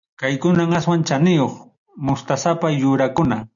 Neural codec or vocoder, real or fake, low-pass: none; real; 7.2 kHz